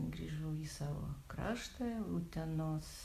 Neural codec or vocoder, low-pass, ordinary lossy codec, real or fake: none; 14.4 kHz; Opus, 64 kbps; real